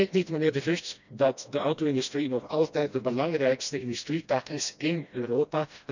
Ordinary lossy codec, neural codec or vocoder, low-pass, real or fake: none; codec, 16 kHz, 1 kbps, FreqCodec, smaller model; 7.2 kHz; fake